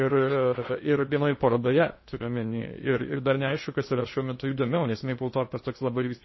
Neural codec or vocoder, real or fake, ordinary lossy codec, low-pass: codec, 16 kHz in and 24 kHz out, 0.8 kbps, FocalCodec, streaming, 65536 codes; fake; MP3, 24 kbps; 7.2 kHz